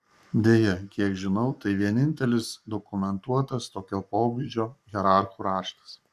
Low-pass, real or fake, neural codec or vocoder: 14.4 kHz; fake; codec, 44.1 kHz, 7.8 kbps, Pupu-Codec